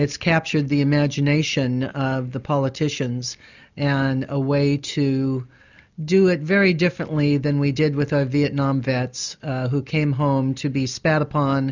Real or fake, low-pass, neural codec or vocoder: real; 7.2 kHz; none